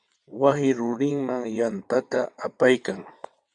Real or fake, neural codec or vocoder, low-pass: fake; vocoder, 22.05 kHz, 80 mel bands, WaveNeXt; 9.9 kHz